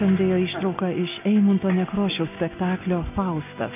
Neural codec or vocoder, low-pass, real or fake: none; 3.6 kHz; real